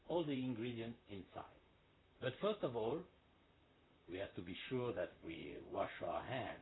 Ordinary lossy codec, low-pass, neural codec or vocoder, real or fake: AAC, 16 kbps; 7.2 kHz; vocoder, 44.1 kHz, 128 mel bands, Pupu-Vocoder; fake